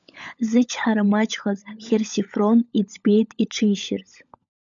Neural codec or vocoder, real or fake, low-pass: codec, 16 kHz, 16 kbps, FunCodec, trained on LibriTTS, 50 frames a second; fake; 7.2 kHz